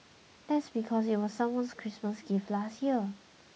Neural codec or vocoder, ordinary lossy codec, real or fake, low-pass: none; none; real; none